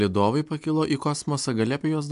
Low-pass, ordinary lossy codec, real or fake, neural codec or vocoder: 10.8 kHz; AAC, 96 kbps; real; none